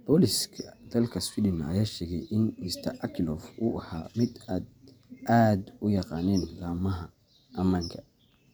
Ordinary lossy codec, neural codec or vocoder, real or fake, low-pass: none; vocoder, 44.1 kHz, 128 mel bands every 512 samples, BigVGAN v2; fake; none